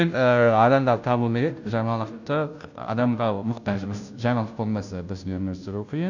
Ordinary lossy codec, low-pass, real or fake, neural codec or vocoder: none; 7.2 kHz; fake; codec, 16 kHz, 0.5 kbps, FunCodec, trained on Chinese and English, 25 frames a second